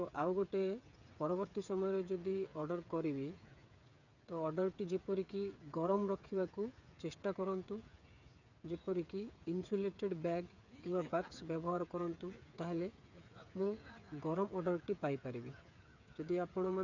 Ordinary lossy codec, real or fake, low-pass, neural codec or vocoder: none; fake; 7.2 kHz; codec, 16 kHz, 8 kbps, FreqCodec, smaller model